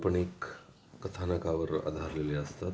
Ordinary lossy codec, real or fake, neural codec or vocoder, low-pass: none; real; none; none